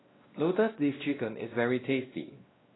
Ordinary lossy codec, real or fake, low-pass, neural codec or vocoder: AAC, 16 kbps; fake; 7.2 kHz; codec, 16 kHz, 1 kbps, X-Codec, WavLM features, trained on Multilingual LibriSpeech